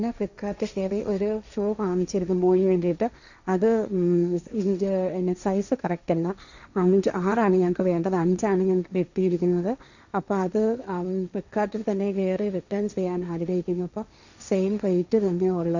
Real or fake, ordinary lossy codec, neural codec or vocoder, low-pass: fake; none; codec, 16 kHz, 1.1 kbps, Voila-Tokenizer; 7.2 kHz